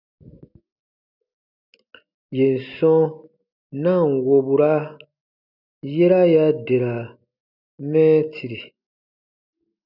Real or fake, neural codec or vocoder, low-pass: real; none; 5.4 kHz